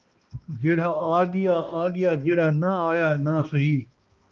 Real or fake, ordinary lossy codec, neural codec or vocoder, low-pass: fake; Opus, 24 kbps; codec, 16 kHz, 1 kbps, X-Codec, HuBERT features, trained on balanced general audio; 7.2 kHz